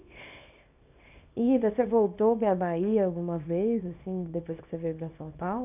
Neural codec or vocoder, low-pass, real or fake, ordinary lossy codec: codec, 24 kHz, 0.9 kbps, WavTokenizer, small release; 3.6 kHz; fake; none